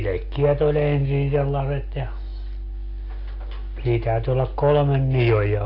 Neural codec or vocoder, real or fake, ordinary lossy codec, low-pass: none; real; AAC, 32 kbps; 5.4 kHz